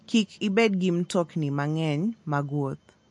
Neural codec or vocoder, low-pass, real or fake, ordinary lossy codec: none; 10.8 kHz; real; MP3, 48 kbps